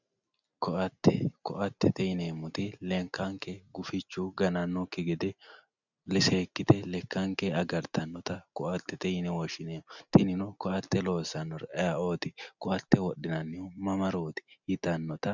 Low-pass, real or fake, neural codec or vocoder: 7.2 kHz; real; none